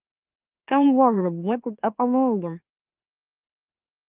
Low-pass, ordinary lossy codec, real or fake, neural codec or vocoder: 3.6 kHz; Opus, 32 kbps; fake; autoencoder, 44.1 kHz, a latent of 192 numbers a frame, MeloTTS